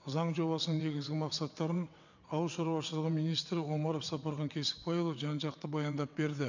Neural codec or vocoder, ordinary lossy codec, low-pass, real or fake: vocoder, 22.05 kHz, 80 mel bands, WaveNeXt; none; 7.2 kHz; fake